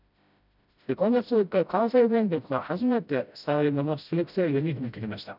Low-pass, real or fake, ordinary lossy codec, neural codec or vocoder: 5.4 kHz; fake; none; codec, 16 kHz, 0.5 kbps, FreqCodec, smaller model